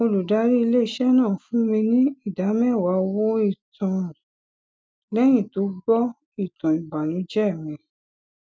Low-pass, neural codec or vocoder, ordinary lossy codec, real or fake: none; none; none; real